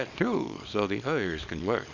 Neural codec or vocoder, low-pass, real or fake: codec, 24 kHz, 0.9 kbps, WavTokenizer, small release; 7.2 kHz; fake